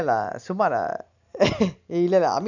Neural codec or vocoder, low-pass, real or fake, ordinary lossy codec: none; 7.2 kHz; real; none